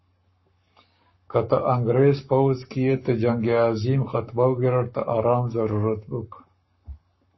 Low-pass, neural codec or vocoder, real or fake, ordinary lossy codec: 7.2 kHz; codec, 44.1 kHz, 7.8 kbps, Pupu-Codec; fake; MP3, 24 kbps